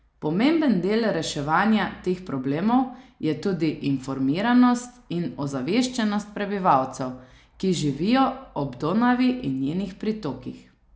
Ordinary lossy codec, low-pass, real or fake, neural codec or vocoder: none; none; real; none